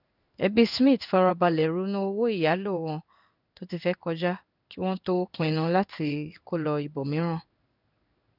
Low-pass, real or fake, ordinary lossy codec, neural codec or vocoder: 5.4 kHz; fake; none; codec, 16 kHz in and 24 kHz out, 1 kbps, XY-Tokenizer